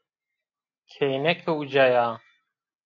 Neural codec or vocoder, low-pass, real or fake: none; 7.2 kHz; real